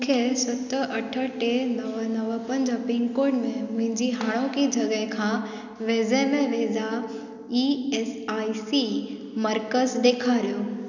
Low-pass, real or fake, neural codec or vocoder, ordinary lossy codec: 7.2 kHz; real; none; none